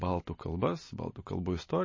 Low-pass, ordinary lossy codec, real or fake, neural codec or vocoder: 7.2 kHz; MP3, 32 kbps; real; none